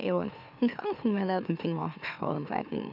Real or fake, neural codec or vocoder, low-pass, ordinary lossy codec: fake; autoencoder, 44.1 kHz, a latent of 192 numbers a frame, MeloTTS; 5.4 kHz; none